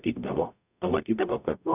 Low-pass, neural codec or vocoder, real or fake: 3.6 kHz; codec, 44.1 kHz, 0.9 kbps, DAC; fake